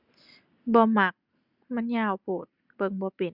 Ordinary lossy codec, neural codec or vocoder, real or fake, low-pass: Opus, 64 kbps; none; real; 5.4 kHz